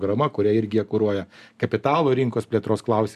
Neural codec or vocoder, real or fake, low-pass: none; real; 14.4 kHz